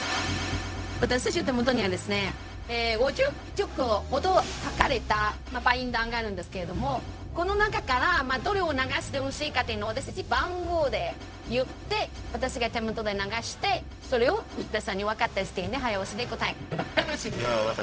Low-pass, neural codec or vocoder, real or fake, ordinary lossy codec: none; codec, 16 kHz, 0.4 kbps, LongCat-Audio-Codec; fake; none